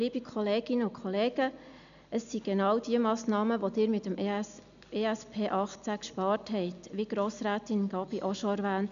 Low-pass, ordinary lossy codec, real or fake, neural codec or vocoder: 7.2 kHz; none; real; none